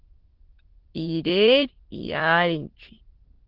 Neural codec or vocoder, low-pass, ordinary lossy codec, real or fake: autoencoder, 22.05 kHz, a latent of 192 numbers a frame, VITS, trained on many speakers; 5.4 kHz; Opus, 16 kbps; fake